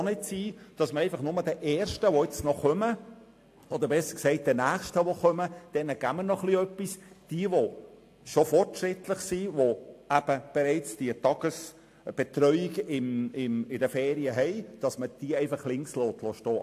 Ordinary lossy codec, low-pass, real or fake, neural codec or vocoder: AAC, 64 kbps; 14.4 kHz; real; none